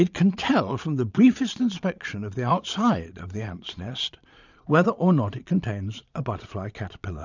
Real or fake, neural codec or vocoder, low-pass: fake; vocoder, 22.05 kHz, 80 mel bands, Vocos; 7.2 kHz